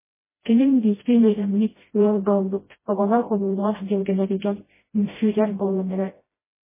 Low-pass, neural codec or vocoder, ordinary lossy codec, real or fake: 3.6 kHz; codec, 16 kHz, 0.5 kbps, FreqCodec, smaller model; MP3, 16 kbps; fake